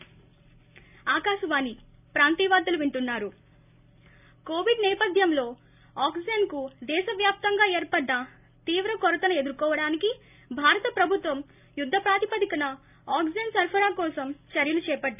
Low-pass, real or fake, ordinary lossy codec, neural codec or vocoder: 3.6 kHz; real; none; none